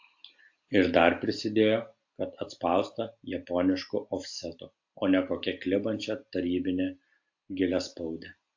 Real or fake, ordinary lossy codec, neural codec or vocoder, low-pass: real; AAC, 48 kbps; none; 7.2 kHz